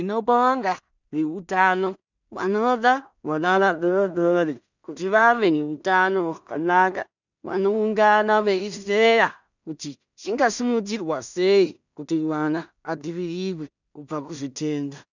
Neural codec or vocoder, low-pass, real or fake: codec, 16 kHz in and 24 kHz out, 0.4 kbps, LongCat-Audio-Codec, two codebook decoder; 7.2 kHz; fake